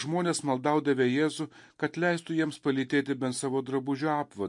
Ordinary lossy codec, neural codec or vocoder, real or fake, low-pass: MP3, 48 kbps; none; real; 10.8 kHz